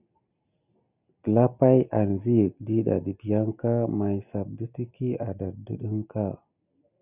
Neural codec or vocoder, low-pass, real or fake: none; 3.6 kHz; real